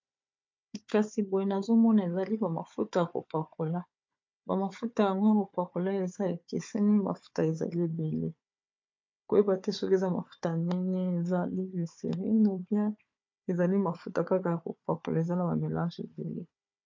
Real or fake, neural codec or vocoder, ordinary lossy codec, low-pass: fake; codec, 16 kHz, 4 kbps, FunCodec, trained on Chinese and English, 50 frames a second; MP3, 48 kbps; 7.2 kHz